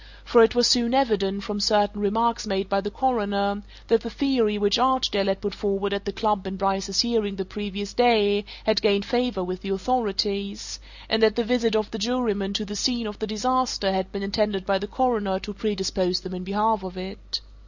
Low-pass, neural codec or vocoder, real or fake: 7.2 kHz; none; real